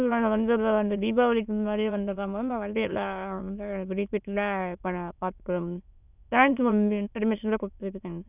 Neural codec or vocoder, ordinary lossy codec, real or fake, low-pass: autoencoder, 22.05 kHz, a latent of 192 numbers a frame, VITS, trained on many speakers; none; fake; 3.6 kHz